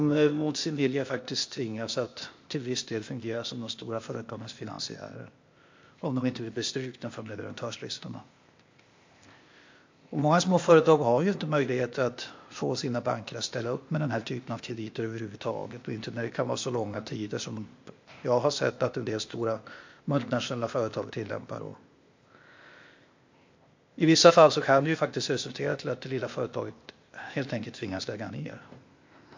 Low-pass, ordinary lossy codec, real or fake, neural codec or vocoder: 7.2 kHz; MP3, 48 kbps; fake; codec, 16 kHz, 0.8 kbps, ZipCodec